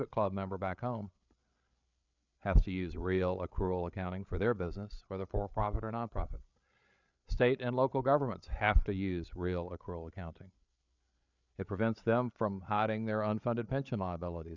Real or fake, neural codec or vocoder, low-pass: fake; codec, 16 kHz, 8 kbps, FreqCodec, larger model; 7.2 kHz